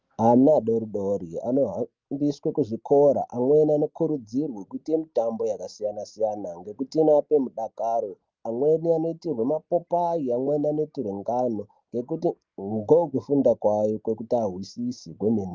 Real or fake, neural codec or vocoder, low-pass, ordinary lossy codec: real; none; 7.2 kHz; Opus, 32 kbps